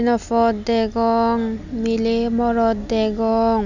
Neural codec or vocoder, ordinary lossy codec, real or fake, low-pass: none; none; real; 7.2 kHz